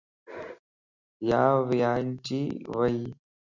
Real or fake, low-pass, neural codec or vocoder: real; 7.2 kHz; none